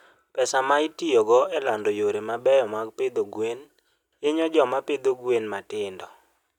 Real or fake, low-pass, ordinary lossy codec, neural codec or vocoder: real; 19.8 kHz; none; none